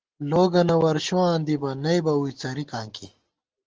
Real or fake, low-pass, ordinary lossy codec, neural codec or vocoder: real; 7.2 kHz; Opus, 16 kbps; none